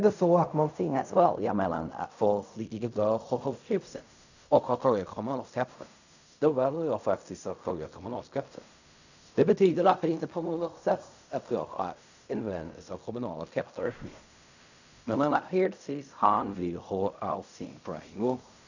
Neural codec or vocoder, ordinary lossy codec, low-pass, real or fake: codec, 16 kHz in and 24 kHz out, 0.4 kbps, LongCat-Audio-Codec, fine tuned four codebook decoder; none; 7.2 kHz; fake